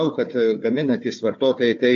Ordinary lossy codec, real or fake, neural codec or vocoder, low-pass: AAC, 48 kbps; fake; codec, 16 kHz, 4 kbps, FunCodec, trained on Chinese and English, 50 frames a second; 7.2 kHz